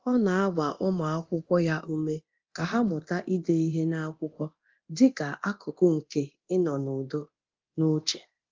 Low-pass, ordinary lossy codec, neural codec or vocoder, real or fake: 7.2 kHz; Opus, 32 kbps; codec, 24 kHz, 0.9 kbps, DualCodec; fake